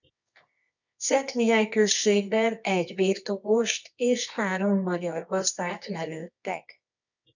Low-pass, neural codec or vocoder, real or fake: 7.2 kHz; codec, 24 kHz, 0.9 kbps, WavTokenizer, medium music audio release; fake